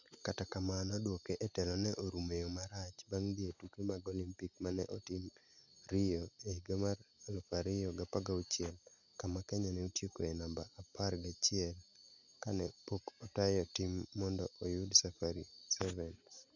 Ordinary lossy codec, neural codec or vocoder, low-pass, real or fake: none; none; 7.2 kHz; real